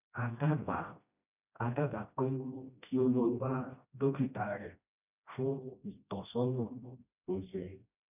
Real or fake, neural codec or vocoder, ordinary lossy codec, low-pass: fake; codec, 16 kHz, 1 kbps, FreqCodec, smaller model; none; 3.6 kHz